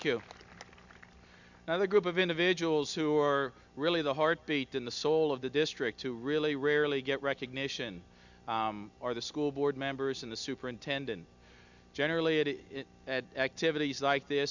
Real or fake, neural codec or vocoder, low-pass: real; none; 7.2 kHz